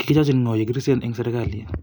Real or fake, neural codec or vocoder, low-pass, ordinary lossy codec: real; none; none; none